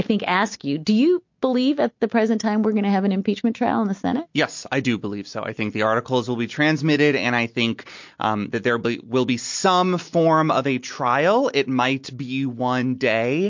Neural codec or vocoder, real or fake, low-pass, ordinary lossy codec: none; real; 7.2 kHz; MP3, 48 kbps